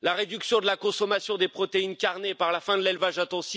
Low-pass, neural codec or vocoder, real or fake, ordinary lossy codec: none; none; real; none